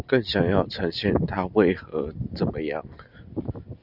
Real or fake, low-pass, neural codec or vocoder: real; 5.4 kHz; none